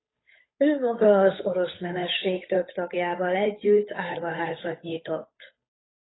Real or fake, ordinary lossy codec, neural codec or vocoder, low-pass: fake; AAC, 16 kbps; codec, 16 kHz, 8 kbps, FunCodec, trained on Chinese and English, 25 frames a second; 7.2 kHz